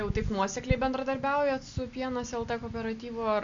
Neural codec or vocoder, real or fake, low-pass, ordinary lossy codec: none; real; 7.2 kHz; Opus, 64 kbps